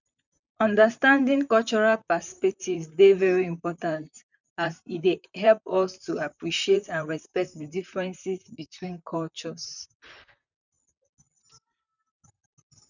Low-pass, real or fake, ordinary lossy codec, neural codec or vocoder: 7.2 kHz; fake; none; vocoder, 44.1 kHz, 128 mel bands, Pupu-Vocoder